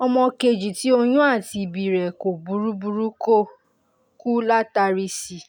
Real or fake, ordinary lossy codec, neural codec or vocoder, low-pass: real; none; none; none